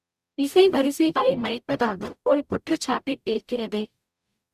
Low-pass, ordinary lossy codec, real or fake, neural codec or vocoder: 14.4 kHz; Opus, 64 kbps; fake; codec, 44.1 kHz, 0.9 kbps, DAC